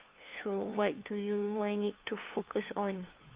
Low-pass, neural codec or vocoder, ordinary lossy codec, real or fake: 3.6 kHz; codec, 16 kHz, 2 kbps, FunCodec, trained on LibriTTS, 25 frames a second; Opus, 24 kbps; fake